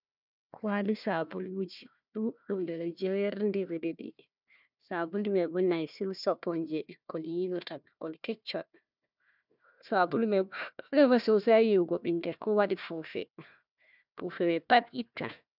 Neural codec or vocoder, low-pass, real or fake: codec, 16 kHz, 1 kbps, FunCodec, trained on Chinese and English, 50 frames a second; 5.4 kHz; fake